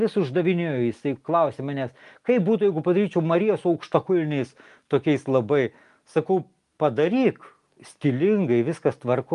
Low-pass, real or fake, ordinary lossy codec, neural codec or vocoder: 10.8 kHz; real; Opus, 32 kbps; none